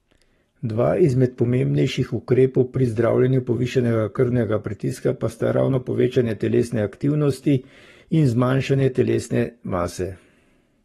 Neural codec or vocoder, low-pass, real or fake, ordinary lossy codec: codec, 44.1 kHz, 7.8 kbps, Pupu-Codec; 19.8 kHz; fake; AAC, 32 kbps